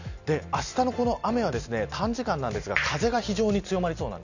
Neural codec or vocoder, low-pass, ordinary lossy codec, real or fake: none; 7.2 kHz; none; real